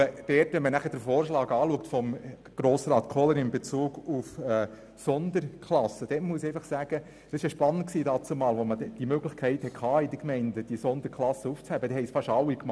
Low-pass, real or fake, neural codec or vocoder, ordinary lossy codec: none; real; none; none